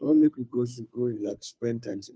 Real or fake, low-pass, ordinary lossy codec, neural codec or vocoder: fake; none; none; codec, 16 kHz, 2 kbps, FunCodec, trained on Chinese and English, 25 frames a second